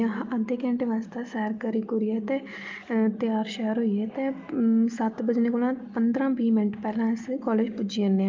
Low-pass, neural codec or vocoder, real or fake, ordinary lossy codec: 7.2 kHz; none; real; Opus, 24 kbps